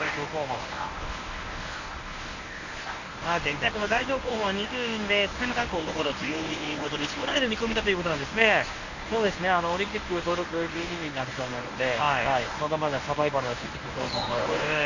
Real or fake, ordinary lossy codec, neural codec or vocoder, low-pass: fake; none; codec, 24 kHz, 0.9 kbps, WavTokenizer, medium speech release version 1; 7.2 kHz